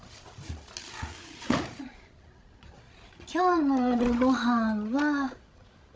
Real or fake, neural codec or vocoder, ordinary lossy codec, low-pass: fake; codec, 16 kHz, 16 kbps, FreqCodec, larger model; none; none